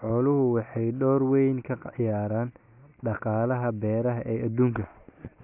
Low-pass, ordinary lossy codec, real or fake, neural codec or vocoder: 3.6 kHz; none; real; none